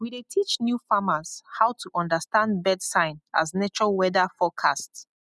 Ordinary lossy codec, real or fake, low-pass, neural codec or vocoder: none; real; none; none